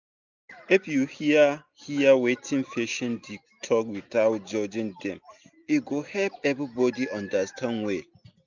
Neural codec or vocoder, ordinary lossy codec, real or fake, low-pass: none; none; real; 7.2 kHz